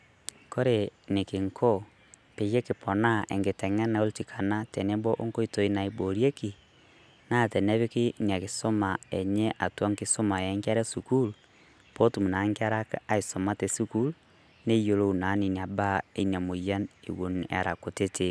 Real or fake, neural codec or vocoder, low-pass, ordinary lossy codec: real; none; none; none